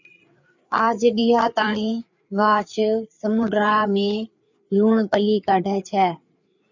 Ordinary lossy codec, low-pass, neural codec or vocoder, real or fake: MP3, 64 kbps; 7.2 kHz; codec, 16 kHz, 4 kbps, FreqCodec, larger model; fake